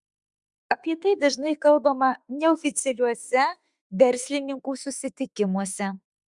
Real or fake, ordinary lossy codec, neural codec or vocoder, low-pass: fake; Opus, 64 kbps; autoencoder, 48 kHz, 32 numbers a frame, DAC-VAE, trained on Japanese speech; 10.8 kHz